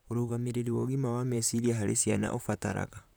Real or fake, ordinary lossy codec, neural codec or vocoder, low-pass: fake; none; vocoder, 44.1 kHz, 128 mel bands, Pupu-Vocoder; none